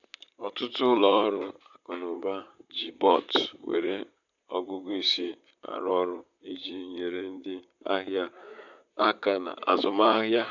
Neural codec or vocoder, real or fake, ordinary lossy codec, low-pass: vocoder, 44.1 kHz, 128 mel bands, Pupu-Vocoder; fake; none; 7.2 kHz